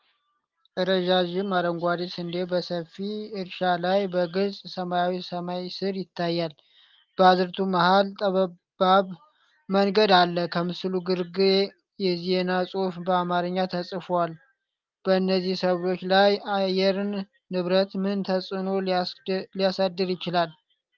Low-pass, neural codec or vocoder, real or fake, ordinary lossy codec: 7.2 kHz; none; real; Opus, 32 kbps